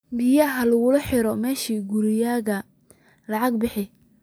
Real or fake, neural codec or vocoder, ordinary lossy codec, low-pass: real; none; none; none